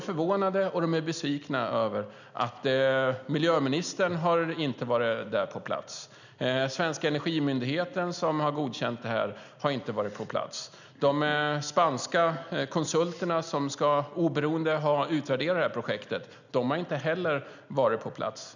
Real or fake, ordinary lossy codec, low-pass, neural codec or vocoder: real; none; 7.2 kHz; none